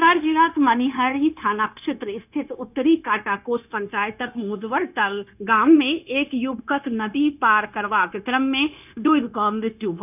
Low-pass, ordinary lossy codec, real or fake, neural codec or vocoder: 3.6 kHz; none; fake; codec, 16 kHz, 0.9 kbps, LongCat-Audio-Codec